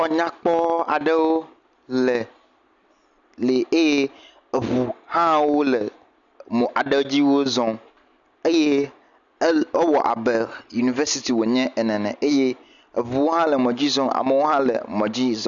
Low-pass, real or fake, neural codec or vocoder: 7.2 kHz; real; none